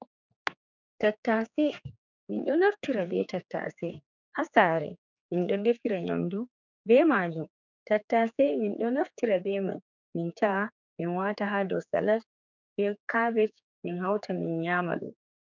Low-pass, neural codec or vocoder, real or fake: 7.2 kHz; codec, 16 kHz, 4 kbps, X-Codec, HuBERT features, trained on general audio; fake